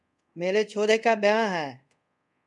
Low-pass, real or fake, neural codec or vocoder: 10.8 kHz; fake; codec, 24 kHz, 0.5 kbps, DualCodec